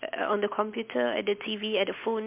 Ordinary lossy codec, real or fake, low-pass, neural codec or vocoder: MP3, 32 kbps; real; 3.6 kHz; none